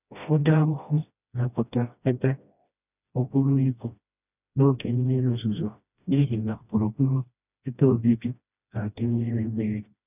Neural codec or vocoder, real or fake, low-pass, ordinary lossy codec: codec, 16 kHz, 1 kbps, FreqCodec, smaller model; fake; 3.6 kHz; none